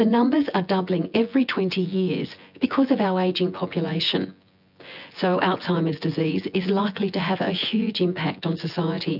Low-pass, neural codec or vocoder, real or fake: 5.4 kHz; vocoder, 24 kHz, 100 mel bands, Vocos; fake